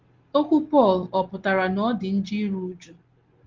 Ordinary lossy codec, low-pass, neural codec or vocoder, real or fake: Opus, 16 kbps; 7.2 kHz; none; real